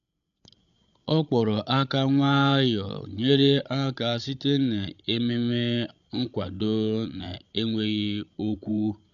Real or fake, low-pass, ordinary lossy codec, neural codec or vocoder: fake; 7.2 kHz; none; codec, 16 kHz, 16 kbps, FreqCodec, larger model